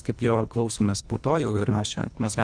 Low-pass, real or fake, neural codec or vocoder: 9.9 kHz; fake; codec, 24 kHz, 1.5 kbps, HILCodec